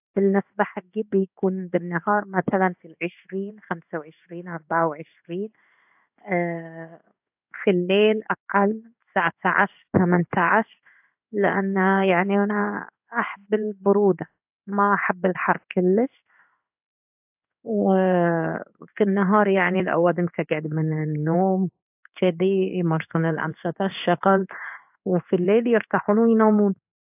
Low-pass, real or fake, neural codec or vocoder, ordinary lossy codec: 3.6 kHz; fake; codec, 16 kHz in and 24 kHz out, 1 kbps, XY-Tokenizer; AAC, 32 kbps